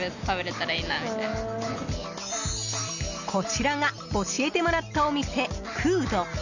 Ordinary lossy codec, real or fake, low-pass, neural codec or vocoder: none; real; 7.2 kHz; none